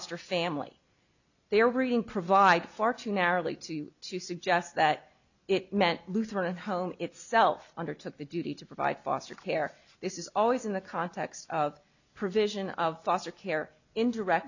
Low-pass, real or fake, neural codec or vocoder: 7.2 kHz; real; none